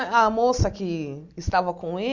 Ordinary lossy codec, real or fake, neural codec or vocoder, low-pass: Opus, 64 kbps; real; none; 7.2 kHz